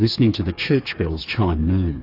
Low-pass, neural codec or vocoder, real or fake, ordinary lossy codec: 5.4 kHz; codec, 16 kHz, 4 kbps, FreqCodec, smaller model; fake; AAC, 32 kbps